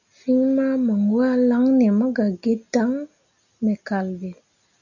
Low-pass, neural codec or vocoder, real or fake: 7.2 kHz; none; real